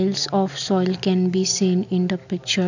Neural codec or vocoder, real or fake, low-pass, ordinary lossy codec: none; real; 7.2 kHz; none